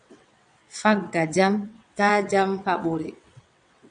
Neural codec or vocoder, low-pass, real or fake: vocoder, 22.05 kHz, 80 mel bands, WaveNeXt; 9.9 kHz; fake